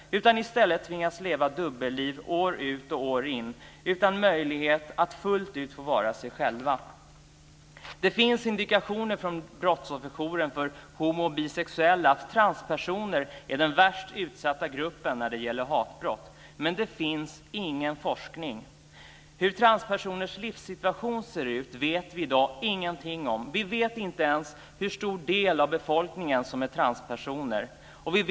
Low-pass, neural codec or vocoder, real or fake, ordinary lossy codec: none; none; real; none